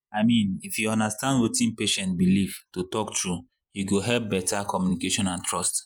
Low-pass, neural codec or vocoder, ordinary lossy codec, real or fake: none; vocoder, 48 kHz, 128 mel bands, Vocos; none; fake